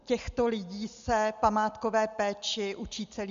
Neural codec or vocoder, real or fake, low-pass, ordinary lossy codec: none; real; 7.2 kHz; Opus, 64 kbps